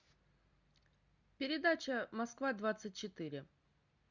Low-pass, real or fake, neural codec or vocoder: 7.2 kHz; real; none